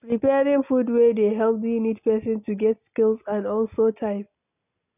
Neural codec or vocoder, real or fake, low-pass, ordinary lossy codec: none; real; 3.6 kHz; none